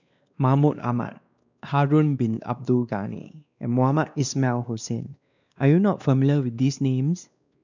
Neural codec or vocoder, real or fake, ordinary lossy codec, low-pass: codec, 16 kHz, 2 kbps, X-Codec, WavLM features, trained on Multilingual LibriSpeech; fake; none; 7.2 kHz